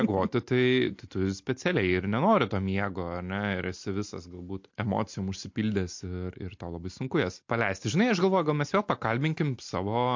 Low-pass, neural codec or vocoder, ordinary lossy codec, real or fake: 7.2 kHz; none; MP3, 48 kbps; real